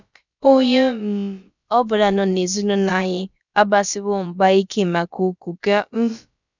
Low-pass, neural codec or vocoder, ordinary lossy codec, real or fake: 7.2 kHz; codec, 16 kHz, about 1 kbps, DyCAST, with the encoder's durations; none; fake